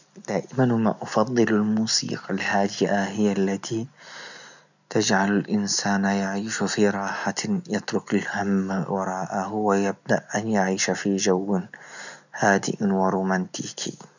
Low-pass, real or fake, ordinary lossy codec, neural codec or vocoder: 7.2 kHz; real; none; none